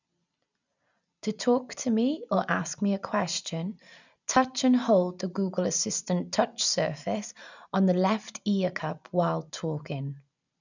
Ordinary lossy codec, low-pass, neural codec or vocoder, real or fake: none; 7.2 kHz; none; real